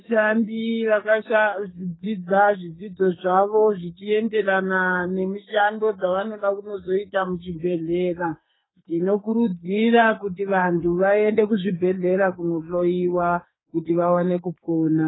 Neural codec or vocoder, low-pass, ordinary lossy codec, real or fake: codec, 44.1 kHz, 2.6 kbps, SNAC; 7.2 kHz; AAC, 16 kbps; fake